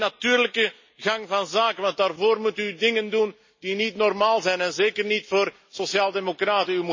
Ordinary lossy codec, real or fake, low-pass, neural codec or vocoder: MP3, 32 kbps; real; 7.2 kHz; none